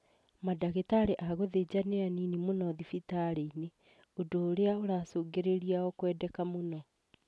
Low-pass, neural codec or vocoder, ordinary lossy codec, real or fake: none; none; none; real